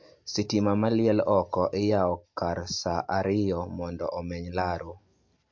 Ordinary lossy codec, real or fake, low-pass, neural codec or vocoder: MP3, 48 kbps; real; 7.2 kHz; none